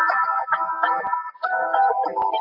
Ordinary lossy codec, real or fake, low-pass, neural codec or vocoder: AAC, 48 kbps; real; 5.4 kHz; none